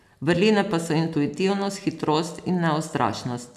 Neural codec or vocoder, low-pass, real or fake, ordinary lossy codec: none; 14.4 kHz; real; none